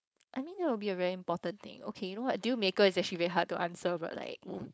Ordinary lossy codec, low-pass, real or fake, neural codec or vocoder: none; none; fake; codec, 16 kHz, 4.8 kbps, FACodec